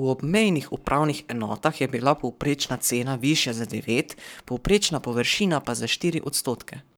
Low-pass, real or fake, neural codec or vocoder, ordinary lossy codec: none; fake; codec, 44.1 kHz, 7.8 kbps, Pupu-Codec; none